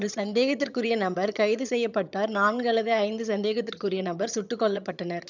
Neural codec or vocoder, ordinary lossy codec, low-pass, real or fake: vocoder, 22.05 kHz, 80 mel bands, HiFi-GAN; none; 7.2 kHz; fake